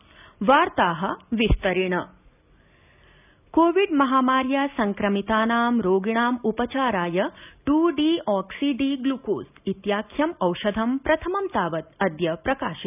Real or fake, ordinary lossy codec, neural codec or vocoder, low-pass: real; none; none; 3.6 kHz